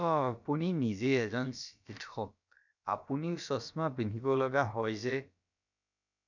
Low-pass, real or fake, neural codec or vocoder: 7.2 kHz; fake; codec, 16 kHz, about 1 kbps, DyCAST, with the encoder's durations